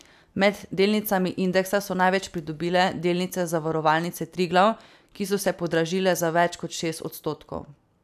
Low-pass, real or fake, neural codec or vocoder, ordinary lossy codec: 14.4 kHz; real; none; none